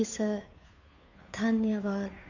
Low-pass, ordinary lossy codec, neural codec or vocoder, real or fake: 7.2 kHz; none; codec, 16 kHz, 2 kbps, FunCodec, trained on Chinese and English, 25 frames a second; fake